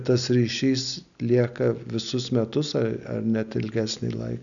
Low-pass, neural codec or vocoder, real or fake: 7.2 kHz; none; real